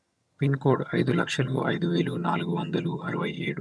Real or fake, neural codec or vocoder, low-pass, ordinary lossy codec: fake; vocoder, 22.05 kHz, 80 mel bands, HiFi-GAN; none; none